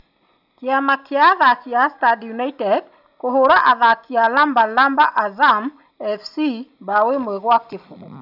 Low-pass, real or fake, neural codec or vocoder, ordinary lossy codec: 5.4 kHz; real; none; none